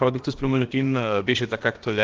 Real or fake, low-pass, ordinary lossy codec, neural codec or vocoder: fake; 7.2 kHz; Opus, 16 kbps; codec, 16 kHz, about 1 kbps, DyCAST, with the encoder's durations